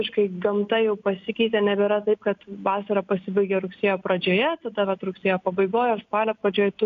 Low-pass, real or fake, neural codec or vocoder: 7.2 kHz; real; none